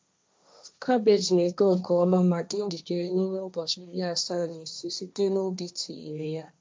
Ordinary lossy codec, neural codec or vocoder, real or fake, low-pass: none; codec, 16 kHz, 1.1 kbps, Voila-Tokenizer; fake; none